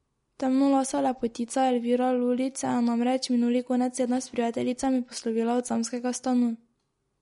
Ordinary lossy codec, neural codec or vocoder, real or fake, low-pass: MP3, 48 kbps; none; real; 10.8 kHz